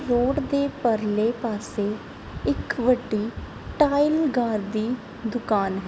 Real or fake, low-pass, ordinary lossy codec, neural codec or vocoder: real; none; none; none